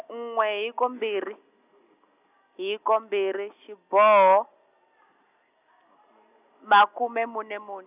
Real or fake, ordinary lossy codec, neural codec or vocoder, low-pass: real; none; none; 3.6 kHz